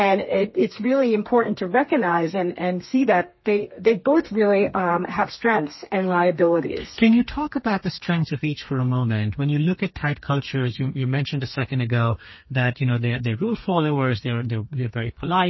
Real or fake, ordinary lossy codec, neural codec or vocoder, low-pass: fake; MP3, 24 kbps; codec, 32 kHz, 1.9 kbps, SNAC; 7.2 kHz